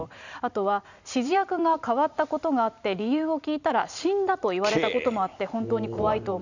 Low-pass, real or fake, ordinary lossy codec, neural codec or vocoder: 7.2 kHz; fake; none; vocoder, 44.1 kHz, 128 mel bands every 512 samples, BigVGAN v2